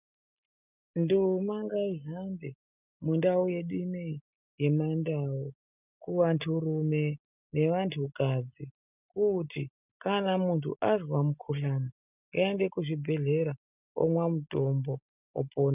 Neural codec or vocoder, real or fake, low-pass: none; real; 3.6 kHz